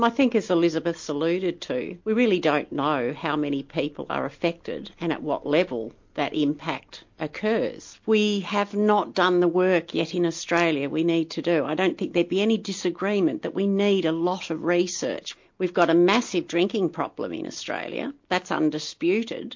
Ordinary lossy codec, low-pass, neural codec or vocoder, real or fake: MP3, 48 kbps; 7.2 kHz; none; real